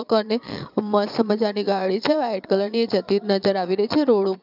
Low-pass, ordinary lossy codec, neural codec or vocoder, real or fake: 5.4 kHz; none; vocoder, 22.05 kHz, 80 mel bands, Vocos; fake